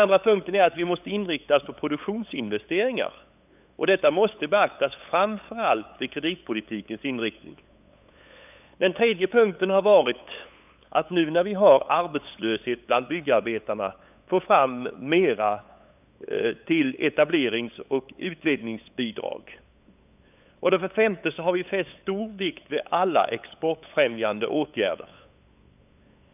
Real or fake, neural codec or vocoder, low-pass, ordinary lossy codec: fake; codec, 16 kHz, 8 kbps, FunCodec, trained on LibriTTS, 25 frames a second; 3.6 kHz; none